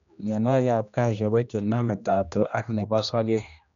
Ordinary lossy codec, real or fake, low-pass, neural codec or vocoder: none; fake; 7.2 kHz; codec, 16 kHz, 1 kbps, X-Codec, HuBERT features, trained on general audio